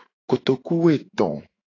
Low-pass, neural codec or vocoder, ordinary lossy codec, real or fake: 7.2 kHz; autoencoder, 48 kHz, 128 numbers a frame, DAC-VAE, trained on Japanese speech; AAC, 32 kbps; fake